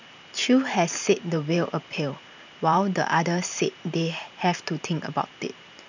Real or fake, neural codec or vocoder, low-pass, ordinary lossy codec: fake; vocoder, 44.1 kHz, 128 mel bands every 512 samples, BigVGAN v2; 7.2 kHz; none